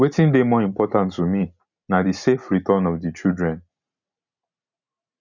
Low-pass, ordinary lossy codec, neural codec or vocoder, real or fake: 7.2 kHz; none; none; real